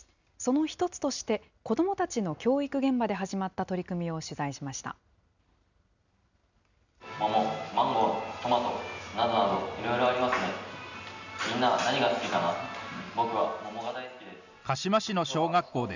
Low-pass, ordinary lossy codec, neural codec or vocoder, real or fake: 7.2 kHz; none; none; real